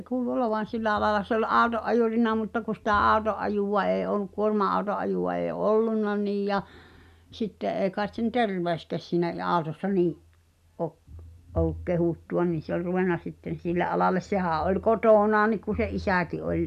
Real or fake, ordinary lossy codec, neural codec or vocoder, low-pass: real; none; none; 14.4 kHz